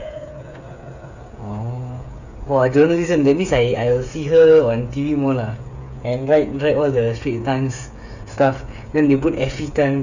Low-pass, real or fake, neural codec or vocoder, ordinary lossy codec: 7.2 kHz; fake; codec, 16 kHz, 8 kbps, FreqCodec, smaller model; none